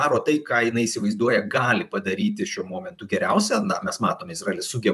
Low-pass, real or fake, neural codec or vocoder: 14.4 kHz; fake; vocoder, 44.1 kHz, 128 mel bands, Pupu-Vocoder